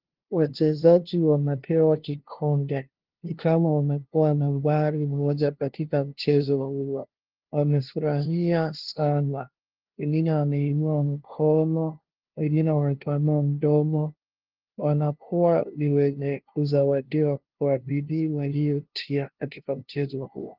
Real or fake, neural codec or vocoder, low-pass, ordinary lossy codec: fake; codec, 16 kHz, 0.5 kbps, FunCodec, trained on LibriTTS, 25 frames a second; 5.4 kHz; Opus, 16 kbps